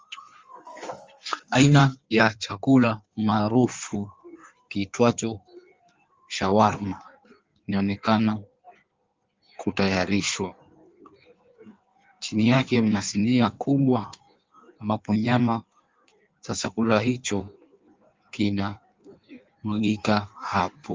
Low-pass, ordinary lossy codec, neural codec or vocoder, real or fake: 7.2 kHz; Opus, 32 kbps; codec, 16 kHz in and 24 kHz out, 1.1 kbps, FireRedTTS-2 codec; fake